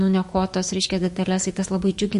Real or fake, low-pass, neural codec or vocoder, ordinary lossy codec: fake; 14.4 kHz; autoencoder, 48 kHz, 128 numbers a frame, DAC-VAE, trained on Japanese speech; MP3, 48 kbps